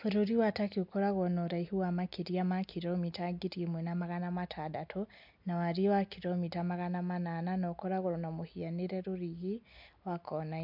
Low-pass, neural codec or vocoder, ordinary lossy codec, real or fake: 5.4 kHz; none; MP3, 48 kbps; real